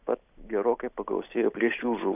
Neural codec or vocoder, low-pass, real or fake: none; 3.6 kHz; real